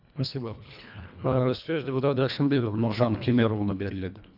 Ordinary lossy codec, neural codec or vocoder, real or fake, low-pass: none; codec, 24 kHz, 1.5 kbps, HILCodec; fake; 5.4 kHz